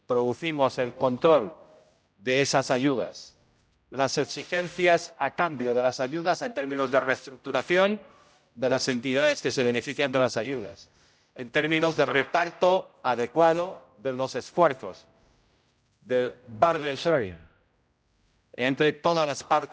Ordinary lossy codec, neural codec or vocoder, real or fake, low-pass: none; codec, 16 kHz, 0.5 kbps, X-Codec, HuBERT features, trained on general audio; fake; none